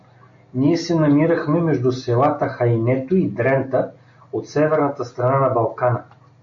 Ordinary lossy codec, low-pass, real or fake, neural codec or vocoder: MP3, 48 kbps; 7.2 kHz; real; none